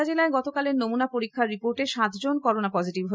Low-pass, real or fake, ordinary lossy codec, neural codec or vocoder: none; real; none; none